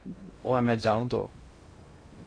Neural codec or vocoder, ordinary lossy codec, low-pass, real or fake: codec, 16 kHz in and 24 kHz out, 0.6 kbps, FocalCodec, streaming, 2048 codes; AAC, 32 kbps; 9.9 kHz; fake